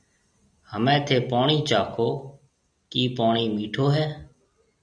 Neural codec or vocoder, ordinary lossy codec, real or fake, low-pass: none; MP3, 96 kbps; real; 9.9 kHz